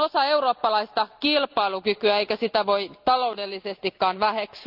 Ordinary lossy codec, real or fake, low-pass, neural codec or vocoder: Opus, 24 kbps; real; 5.4 kHz; none